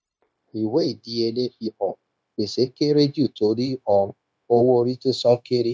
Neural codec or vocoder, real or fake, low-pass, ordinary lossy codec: codec, 16 kHz, 0.9 kbps, LongCat-Audio-Codec; fake; none; none